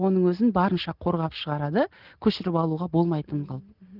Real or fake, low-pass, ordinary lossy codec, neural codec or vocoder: real; 5.4 kHz; Opus, 16 kbps; none